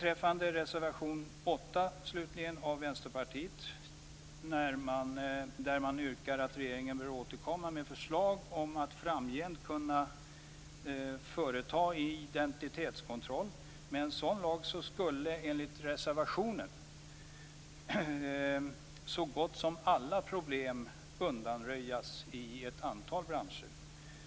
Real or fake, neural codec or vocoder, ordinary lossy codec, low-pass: real; none; none; none